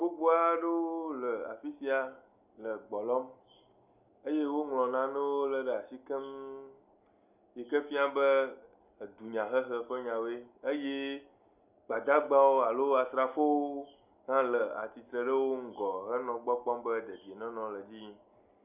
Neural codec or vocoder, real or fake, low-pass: none; real; 3.6 kHz